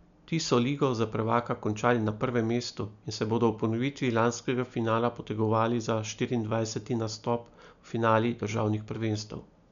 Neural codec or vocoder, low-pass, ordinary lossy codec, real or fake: none; 7.2 kHz; none; real